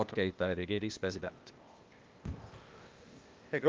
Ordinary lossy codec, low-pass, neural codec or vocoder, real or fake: Opus, 24 kbps; 7.2 kHz; codec, 16 kHz, 0.8 kbps, ZipCodec; fake